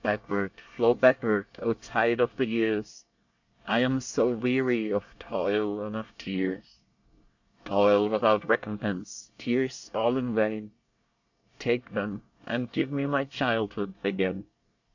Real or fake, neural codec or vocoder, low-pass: fake; codec, 24 kHz, 1 kbps, SNAC; 7.2 kHz